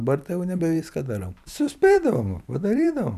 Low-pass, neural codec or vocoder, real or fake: 14.4 kHz; vocoder, 44.1 kHz, 128 mel bands every 256 samples, BigVGAN v2; fake